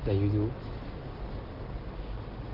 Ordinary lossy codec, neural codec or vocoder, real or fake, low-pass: Opus, 16 kbps; none; real; 5.4 kHz